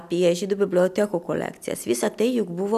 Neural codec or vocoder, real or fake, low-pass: none; real; 14.4 kHz